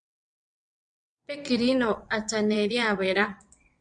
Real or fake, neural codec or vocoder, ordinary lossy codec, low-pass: fake; vocoder, 22.05 kHz, 80 mel bands, WaveNeXt; MP3, 64 kbps; 9.9 kHz